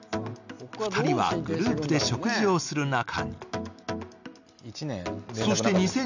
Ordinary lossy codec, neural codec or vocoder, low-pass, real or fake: none; none; 7.2 kHz; real